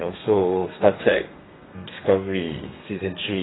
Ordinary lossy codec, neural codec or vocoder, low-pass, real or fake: AAC, 16 kbps; codec, 32 kHz, 1.9 kbps, SNAC; 7.2 kHz; fake